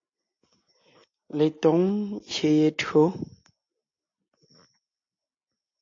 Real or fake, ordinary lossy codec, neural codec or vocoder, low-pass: real; AAC, 48 kbps; none; 7.2 kHz